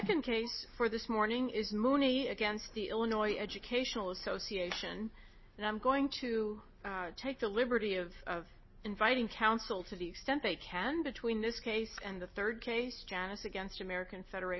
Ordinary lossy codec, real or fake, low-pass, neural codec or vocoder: MP3, 24 kbps; real; 7.2 kHz; none